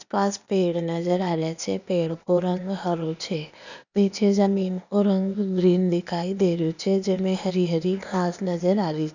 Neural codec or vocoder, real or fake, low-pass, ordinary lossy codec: codec, 16 kHz, 0.8 kbps, ZipCodec; fake; 7.2 kHz; none